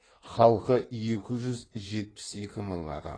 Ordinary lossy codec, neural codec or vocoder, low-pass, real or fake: AAC, 32 kbps; codec, 16 kHz in and 24 kHz out, 1.1 kbps, FireRedTTS-2 codec; 9.9 kHz; fake